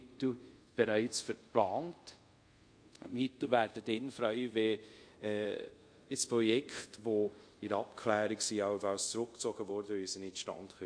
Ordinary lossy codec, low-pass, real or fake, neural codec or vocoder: MP3, 48 kbps; 9.9 kHz; fake; codec, 24 kHz, 0.5 kbps, DualCodec